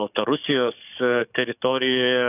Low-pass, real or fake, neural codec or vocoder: 3.6 kHz; fake; codec, 44.1 kHz, 7.8 kbps, DAC